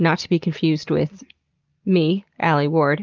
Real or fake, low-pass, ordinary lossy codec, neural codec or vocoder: real; 7.2 kHz; Opus, 32 kbps; none